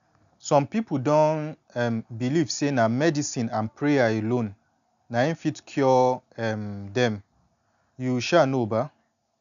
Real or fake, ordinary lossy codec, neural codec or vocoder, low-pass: real; none; none; 7.2 kHz